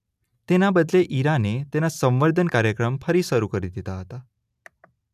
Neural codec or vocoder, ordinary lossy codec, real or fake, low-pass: none; none; real; 14.4 kHz